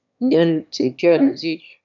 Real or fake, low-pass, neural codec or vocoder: fake; 7.2 kHz; autoencoder, 22.05 kHz, a latent of 192 numbers a frame, VITS, trained on one speaker